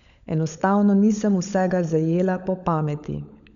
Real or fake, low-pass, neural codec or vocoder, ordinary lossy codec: fake; 7.2 kHz; codec, 16 kHz, 16 kbps, FunCodec, trained on LibriTTS, 50 frames a second; none